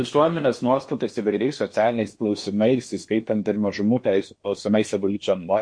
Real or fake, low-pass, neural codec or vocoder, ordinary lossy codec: fake; 9.9 kHz; codec, 16 kHz in and 24 kHz out, 0.8 kbps, FocalCodec, streaming, 65536 codes; MP3, 48 kbps